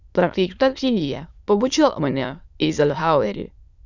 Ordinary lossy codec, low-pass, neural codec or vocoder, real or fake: Opus, 64 kbps; 7.2 kHz; autoencoder, 22.05 kHz, a latent of 192 numbers a frame, VITS, trained on many speakers; fake